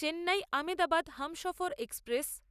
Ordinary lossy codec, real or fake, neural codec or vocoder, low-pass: none; real; none; 14.4 kHz